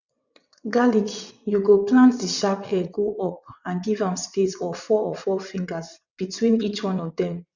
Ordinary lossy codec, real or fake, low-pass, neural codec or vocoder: none; fake; 7.2 kHz; vocoder, 22.05 kHz, 80 mel bands, WaveNeXt